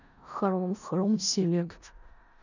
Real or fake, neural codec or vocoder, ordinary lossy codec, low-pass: fake; codec, 16 kHz in and 24 kHz out, 0.4 kbps, LongCat-Audio-Codec, four codebook decoder; none; 7.2 kHz